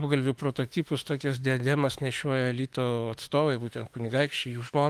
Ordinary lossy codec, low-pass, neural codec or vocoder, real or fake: Opus, 24 kbps; 14.4 kHz; autoencoder, 48 kHz, 32 numbers a frame, DAC-VAE, trained on Japanese speech; fake